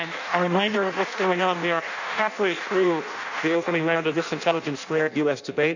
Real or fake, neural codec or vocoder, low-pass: fake; codec, 16 kHz in and 24 kHz out, 0.6 kbps, FireRedTTS-2 codec; 7.2 kHz